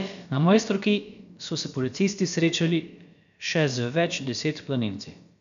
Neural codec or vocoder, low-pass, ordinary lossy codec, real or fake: codec, 16 kHz, about 1 kbps, DyCAST, with the encoder's durations; 7.2 kHz; none; fake